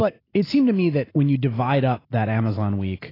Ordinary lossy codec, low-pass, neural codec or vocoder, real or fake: AAC, 24 kbps; 5.4 kHz; none; real